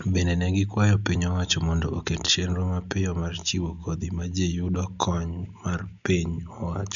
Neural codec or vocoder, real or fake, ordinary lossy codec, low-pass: none; real; none; 7.2 kHz